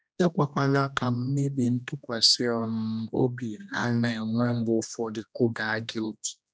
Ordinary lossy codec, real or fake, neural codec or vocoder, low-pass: none; fake; codec, 16 kHz, 1 kbps, X-Codec, HuBERT features, trained on general audio; none